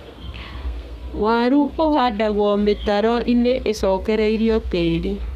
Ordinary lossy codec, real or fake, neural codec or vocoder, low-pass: none; fake; codec, 32 kHz, 1.9 kbps, SNAC; 14.4 kHz